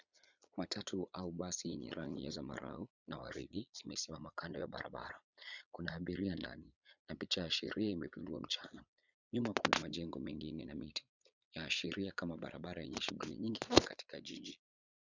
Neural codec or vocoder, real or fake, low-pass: vocoder, 22.05 kHz, 80 mel bands, Vocos; fake; 7.2 kHz